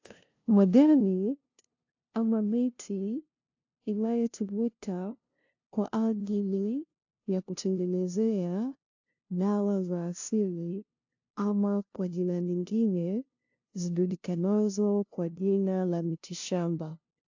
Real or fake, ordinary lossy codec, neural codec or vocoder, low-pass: fake; AAC, 48 kbps; codec, 16 kHz, 0.5 kbps, FunCodec, trained on LibriTTS, 25 frames a second; 7.2 kHz